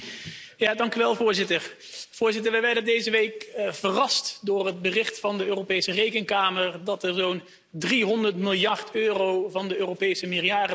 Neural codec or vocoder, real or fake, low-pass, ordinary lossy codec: none; real; none; none